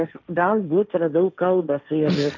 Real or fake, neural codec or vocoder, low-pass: fake; codec, 16 kHz, 1.1 kbps, Voila-Tokenizer; 7.2 kHz